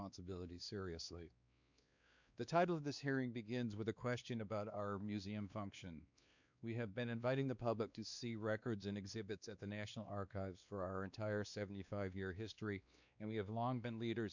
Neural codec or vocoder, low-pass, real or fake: codec, 16 kHz, 2 kbps, X-Codec, WavLM features, trained on Multilingual LibriSpeech; 7.2 kHz; fake